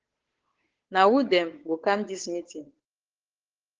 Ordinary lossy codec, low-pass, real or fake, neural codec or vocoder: Opus, 16 kbps; 7.2 kHz; fake; codec, 16 kHz, 8 kbps, FunCodec, trained on Chinese and English, 25 frames a second